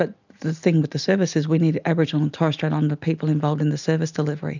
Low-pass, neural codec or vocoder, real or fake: 7.2 kHz; none; real